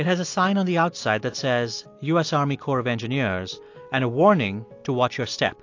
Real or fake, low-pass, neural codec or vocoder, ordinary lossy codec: real; 7.2 kHz; none; AAC, 48 kbps